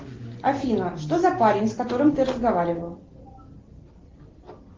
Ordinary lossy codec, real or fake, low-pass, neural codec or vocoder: Opus, 16 kbps; real; 7.2 kHz; none